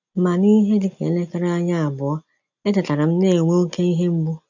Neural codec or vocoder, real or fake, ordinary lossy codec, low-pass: none; real; none; 7.2 kHz